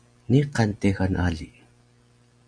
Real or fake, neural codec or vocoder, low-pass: real; none; 9.9 kHz